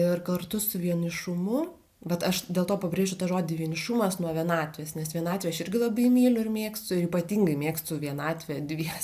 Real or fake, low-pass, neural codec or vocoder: real; 14.4 kHz; none